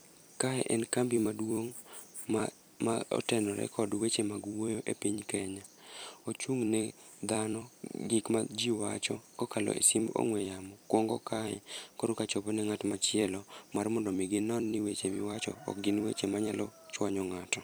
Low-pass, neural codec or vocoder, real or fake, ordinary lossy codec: none; vocoder, 44.1 kHz, 128 mel bands every 256 samples, BigVGAN v2; fake; none